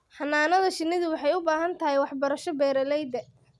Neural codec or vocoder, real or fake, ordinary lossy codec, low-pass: none; real; none; none